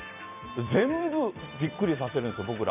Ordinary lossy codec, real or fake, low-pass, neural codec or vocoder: none; fake; 3.6 kHz; vocoder, 44.1 kHz, 128 mel bands every 256 samples, BigVGAN v2